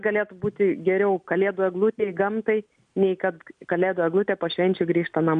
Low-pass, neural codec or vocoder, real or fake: 9.9 kHz; none; real